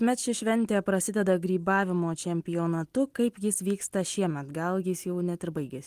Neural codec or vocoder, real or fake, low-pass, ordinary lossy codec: none; real; 14.4 kHz; Opus, 24 kbps